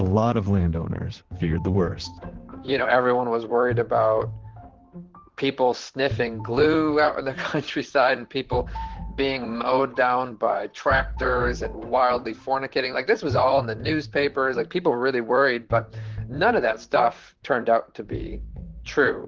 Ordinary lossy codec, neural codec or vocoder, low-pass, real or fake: Opus, 16 kbps; vocoder, 44.1 kHz, 128 mel bands, Pupu-Vocoder; 7.2 kHz; fake